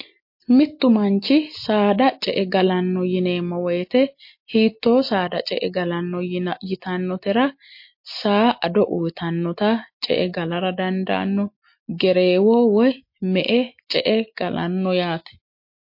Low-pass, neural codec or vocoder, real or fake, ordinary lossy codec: 5.4 kHz; none; real; MP3, 32 kbps